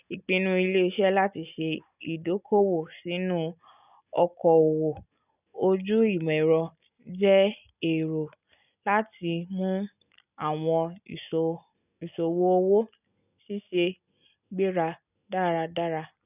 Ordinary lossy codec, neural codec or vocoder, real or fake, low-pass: none; none; real; 3.6 kHz